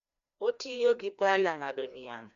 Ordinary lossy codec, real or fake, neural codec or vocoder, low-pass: none; fake; codec, 16 kHz, 1 kbps, FreqCodec, larger model; 7.2 kHz